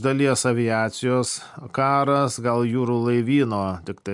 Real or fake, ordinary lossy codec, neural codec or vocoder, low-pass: real; MP3, 64 kbps; none; 10.8 kHz